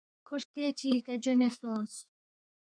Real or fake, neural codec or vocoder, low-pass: fake; codec, 32 kHz, 1.9 kbps, SNAC; 9.9 kHz